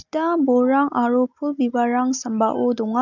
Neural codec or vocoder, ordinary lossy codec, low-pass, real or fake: none; none; 7.2 kHz; real